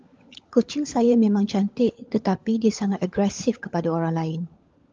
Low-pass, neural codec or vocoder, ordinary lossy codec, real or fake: 7.2 kHz; codec, 16 kHz, 16 kbps, FunCodec, trained on LibriTTS, 50 frames a second; Opus, 24 kbps; fake